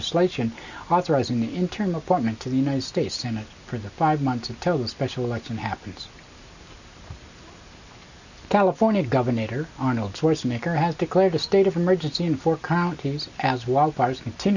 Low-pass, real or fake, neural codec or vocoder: 7.2 kHz; real; none